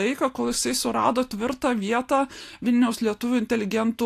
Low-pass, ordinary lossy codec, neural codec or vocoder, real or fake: 14.4 kHz; AAC, 64 kbps; none; real